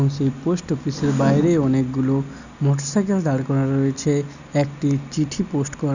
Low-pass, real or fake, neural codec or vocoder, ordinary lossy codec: 7.2 kHz; real; none; none